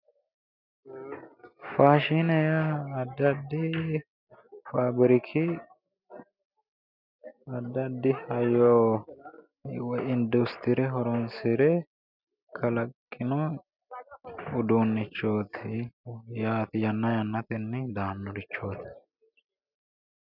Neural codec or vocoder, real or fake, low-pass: none; real; 5.4 kHz